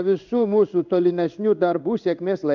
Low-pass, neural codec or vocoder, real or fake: 7.2 kHz; codec, 16 kHz in and 24 kHz out, 1 kbps, XY-Tokenizer; fake